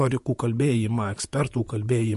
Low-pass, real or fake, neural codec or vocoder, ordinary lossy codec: 14.4 kHz; fake; vocoder, 48 kHz, 128 mel bands, Vocos; MP3, 48 kbps